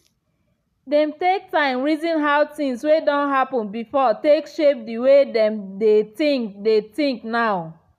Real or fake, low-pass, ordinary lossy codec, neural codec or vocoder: real; 14.4 kHz; none; none